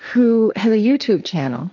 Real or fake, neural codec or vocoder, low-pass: fake; codec, 16 kHz, 1.1 kbps, Voila-Tokenizer; 7.2 kHz